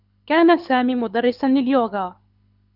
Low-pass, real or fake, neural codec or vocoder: 5.4 kHz; fake; codec, 24 kHz, 6 kbps, HILCodec